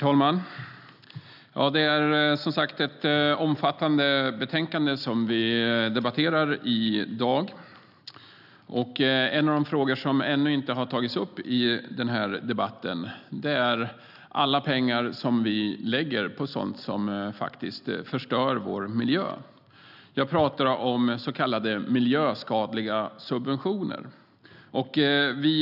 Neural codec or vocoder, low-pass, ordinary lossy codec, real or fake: none; 5.4 kHz; none; real